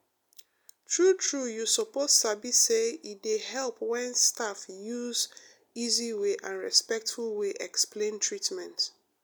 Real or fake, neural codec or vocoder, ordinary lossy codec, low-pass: real; none; none; none